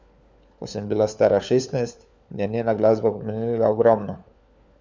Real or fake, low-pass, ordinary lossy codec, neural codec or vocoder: fake; none; none; codec, 16 kHz, 4 kbps, FunCodec, trained on LibriTTS, 50 frames a second